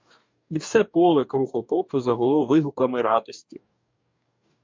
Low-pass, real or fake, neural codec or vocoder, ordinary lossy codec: 7.2 kHz; fake; codec, 44.1 kHz, 2.6 kbps, DAC; MP3, 64 kbps